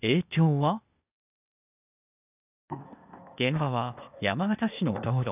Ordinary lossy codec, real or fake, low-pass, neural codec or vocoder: none; fake; 3.6 kHz; codec, 16 kHz, 0.8 kbps, ZipCodec